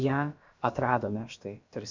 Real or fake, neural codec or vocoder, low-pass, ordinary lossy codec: fake; codec, 16 kHz, about 1 kbps, DyCAST, with the encoder's durations; 7.2 kHz; MP3, 64 kbps